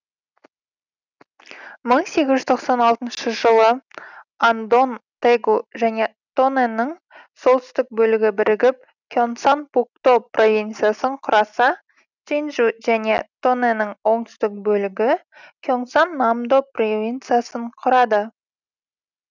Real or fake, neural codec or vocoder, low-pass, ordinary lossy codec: real; none; 7.2 kHz; none